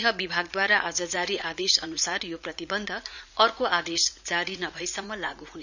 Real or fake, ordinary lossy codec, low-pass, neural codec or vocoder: fake; none; 7.2 kHz; vocoder, 44.1 kHz, 80 mel bands, Vocos